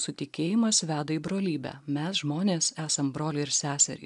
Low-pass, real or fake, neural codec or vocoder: 10.8 kHz; real; none